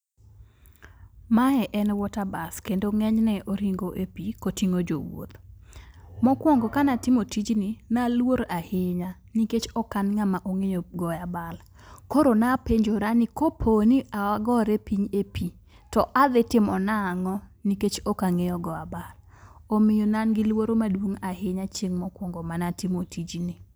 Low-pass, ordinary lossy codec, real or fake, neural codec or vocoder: none; none; real; none